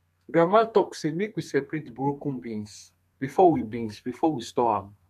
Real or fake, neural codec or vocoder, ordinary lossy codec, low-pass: fake; codec, 32 kHz, 1.9 kbps, SNAC; MP3, 96 kbps; 14.4 kHz